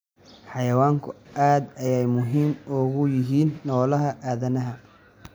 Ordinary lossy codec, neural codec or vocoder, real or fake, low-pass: none; none; real; none